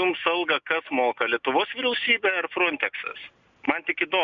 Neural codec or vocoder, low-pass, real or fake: none; 7.2 kHz; real